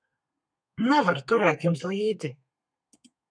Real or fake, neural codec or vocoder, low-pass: fake; codec, 32 kHz, 1.9 kbps, SNAC; 9.9 kHz